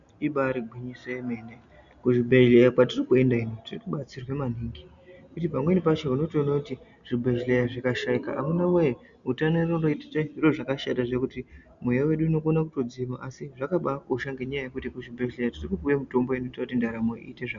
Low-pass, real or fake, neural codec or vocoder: 7.2 kHz; real; none